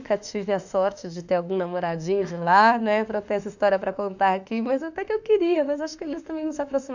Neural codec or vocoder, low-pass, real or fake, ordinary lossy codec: autoencoder, 48 kHz, 32 numbers a frame, DAC-VAE, trained on Japanese speech; 7.2 kHz; fake; none